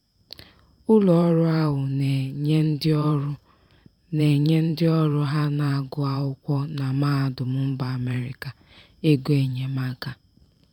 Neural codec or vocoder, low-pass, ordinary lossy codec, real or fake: vocoder, 44.1 kHz, 128 mel bands every 512 samples, BigVGAN v2; 19.8 kHz; none; fake